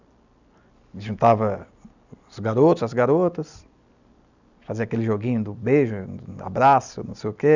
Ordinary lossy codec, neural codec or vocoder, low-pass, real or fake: none; none; 7.2 kHz; real